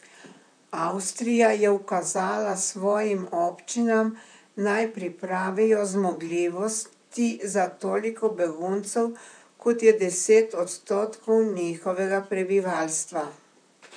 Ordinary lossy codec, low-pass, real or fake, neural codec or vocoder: none; 9.9 kHz; fake; vocoder, 44.1 kHz, 128 mel bands, Pupu-Vocoder